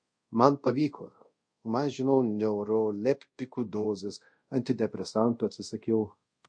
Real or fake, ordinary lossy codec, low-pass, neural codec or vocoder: fake; MP3, 48 kbps; 9.9 kHz; codec, 24 kHz, 0.5 kbps, DualCodec